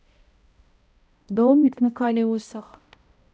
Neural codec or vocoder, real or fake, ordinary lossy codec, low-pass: codec, 16 kHz, 0.5 kbps, X-Codec, HuBERT features, trained on balanced general audio; fake; none; none